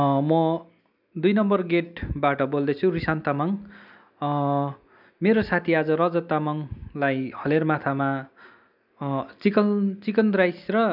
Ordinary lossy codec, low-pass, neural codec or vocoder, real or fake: none; 5.4 kHz; none; real